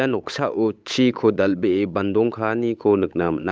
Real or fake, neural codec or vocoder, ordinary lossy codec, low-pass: fake; codec, 16 kHz, 8 kbps, FunCodec, trained on Chinese and English, 25 frames a second; none; none